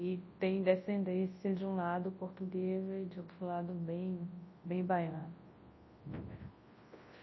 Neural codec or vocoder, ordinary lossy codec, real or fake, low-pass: codec, 24 kHz, 0.9 kbps, WavTokenizer, large speech release; MP3, 24 kbps; fake; 7.2 kHz